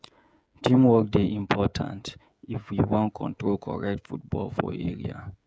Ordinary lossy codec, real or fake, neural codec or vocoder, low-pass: none; fake; codec, 16 kHz, 16 kbps, FreqCodec, smaller model; none